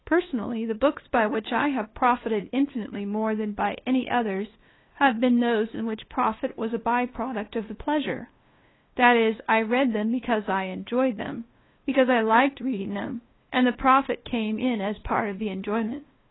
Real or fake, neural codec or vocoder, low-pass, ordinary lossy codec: fake; codec, 16 kHz, 2 kbps, FunCodec, trained on LibriTTS, 25 frames a second; 7.2 kHz; AAC, 16 kbps